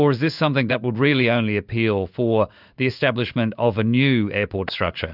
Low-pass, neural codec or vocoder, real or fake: 5.4 kHz; codec, 16 kHz in and 24 kHz out, 1 kbps, XY-Tokenizer; fake